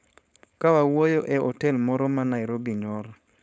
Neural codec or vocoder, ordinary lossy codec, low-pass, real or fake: codec, 16 kHz, 4.8 kbps, FACodec; none; none; fake